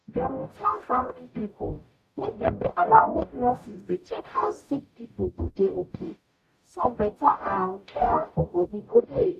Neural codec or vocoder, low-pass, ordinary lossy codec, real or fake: codec, 44.1 kHz, 0.9 kbps, DAC; 14.4 kHz; none; fake